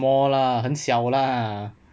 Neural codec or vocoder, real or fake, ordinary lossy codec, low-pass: none; real; none; none